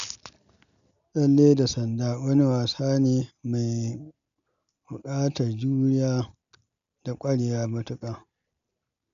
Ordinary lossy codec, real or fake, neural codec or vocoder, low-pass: none; real; none; 7.2 kHz